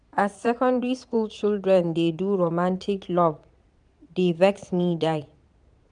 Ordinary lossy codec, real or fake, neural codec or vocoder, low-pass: none; fake; vocoder, 22.05 kHz, 80 mel bands, Vocos; 9.9 kHz